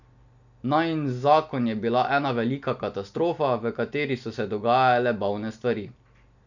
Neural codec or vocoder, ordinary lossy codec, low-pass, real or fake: none; none; 7.2 kHz; real